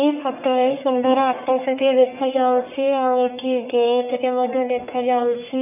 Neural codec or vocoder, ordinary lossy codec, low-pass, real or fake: codec, 44.1 kHz, 1.7 kbps, Pupu-Codec; none; 3.6 kHz; fake